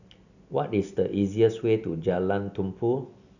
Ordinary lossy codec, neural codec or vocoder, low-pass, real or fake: none; none; 7.2 kHz; real